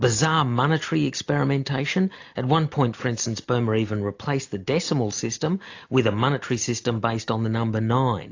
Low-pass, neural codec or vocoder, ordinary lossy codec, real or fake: 7.2 kHz; none; AAC, 48 kbps; real